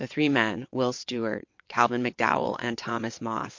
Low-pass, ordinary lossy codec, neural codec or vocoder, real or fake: 7.2 kHz; MP3, 48 kbps; vocoder, 22.05 kHz, 80 mel bands, WaveNeXt; fake